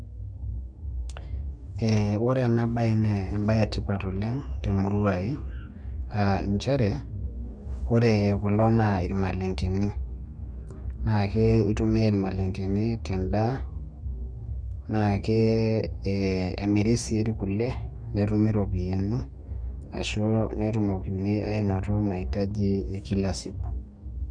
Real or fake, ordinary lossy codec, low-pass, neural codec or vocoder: fake; none; 9.9 kHz; codec, 44.1 kHz, 2.6 kbps, DAC